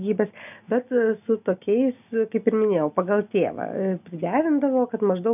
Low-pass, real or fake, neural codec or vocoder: 3.6 kHz; real; none